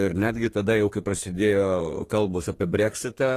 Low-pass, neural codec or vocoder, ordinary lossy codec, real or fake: 14.4 kHz; codec, 32 kHz, 1.9 kbps, SNAC; AAC, 48 kbps; fake